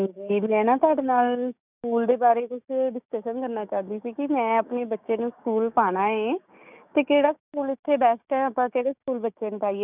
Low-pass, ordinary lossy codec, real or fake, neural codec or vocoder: 3.6 kHz; none; fake; codec, 16 kHz, 6 kbps, DAC